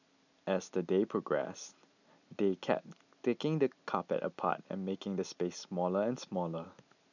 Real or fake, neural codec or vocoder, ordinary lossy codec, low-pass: real; none; none; 7.2 kHz